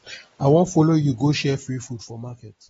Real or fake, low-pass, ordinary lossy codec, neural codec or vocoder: real; 19.8 kHz; AAC, 24 kbps; none